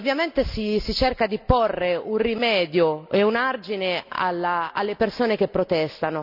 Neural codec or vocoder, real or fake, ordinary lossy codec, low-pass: none; real; none; 5.4 kHz